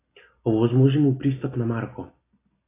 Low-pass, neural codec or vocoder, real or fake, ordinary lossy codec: 3.6 kHz; none; real; AAC, 24 kbps